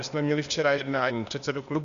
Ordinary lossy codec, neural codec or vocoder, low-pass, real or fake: Opus, 64 kbps; codec, 16 kHz, 0.8 kbps, ZipCodec; 7.2 kHz; fake